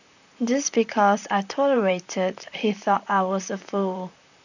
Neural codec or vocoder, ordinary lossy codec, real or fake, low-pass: vocoder, 44.1 kHz, 128 mel bands, Pupu-Vocoder; none; fake; 7.2 kHz